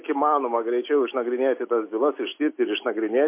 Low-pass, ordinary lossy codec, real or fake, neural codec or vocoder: 3.6 kHz; MP3, 24 kbps; real; none